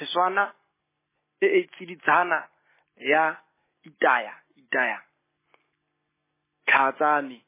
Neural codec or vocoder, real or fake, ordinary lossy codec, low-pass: none; real; MP3, 16 kbps; 3.6 kHz